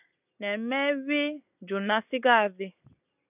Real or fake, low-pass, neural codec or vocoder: real; 3.6 kHz; none